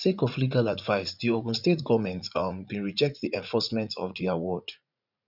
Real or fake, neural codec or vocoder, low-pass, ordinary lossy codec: fake; vocoder, 44.1 kHz, 80 mel bands, Vocos; 5.4 kHz; none